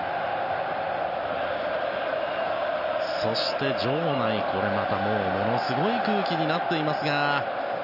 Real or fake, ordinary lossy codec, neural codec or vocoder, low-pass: real; none; none; 5.4 kHz